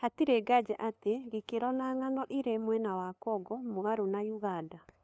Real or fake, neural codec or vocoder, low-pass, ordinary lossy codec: fake; codec, 16 kHz, 2 kbps, FunCodec, trained on LibriTTS, 25 frames a second; none; none